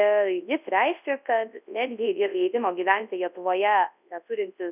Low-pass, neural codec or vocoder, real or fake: 3.6 kHz; codec, 24 kHz, 0.9 kbps, WavTokenizer, large speech release; fake